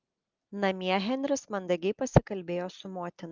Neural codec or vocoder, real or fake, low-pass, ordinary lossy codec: none; real; 7.2 kHz; Opus, 32 kbps